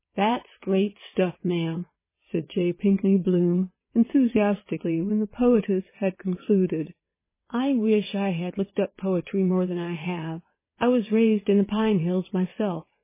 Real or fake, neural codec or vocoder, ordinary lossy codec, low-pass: fake; vocoder, 22.05 kHz, 80 mel bands, WaveNeXt; MP3, 16 kbps; 3.6 kHz